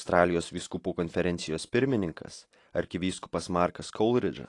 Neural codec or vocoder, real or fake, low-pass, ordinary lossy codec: none; real; 10.8 kHz; AAC, 48 kbps